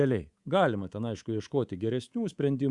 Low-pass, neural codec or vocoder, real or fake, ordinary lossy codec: 10.8 kHz; codec, 24 kHz, 3.1 kbps, DualCodec; fake; Opus, 64 kbps